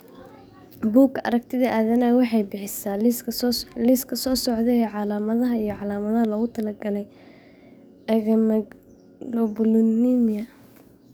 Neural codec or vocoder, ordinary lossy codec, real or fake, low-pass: codec, 44.1 kHz, 7.8 kbps, DAC; none; fake; none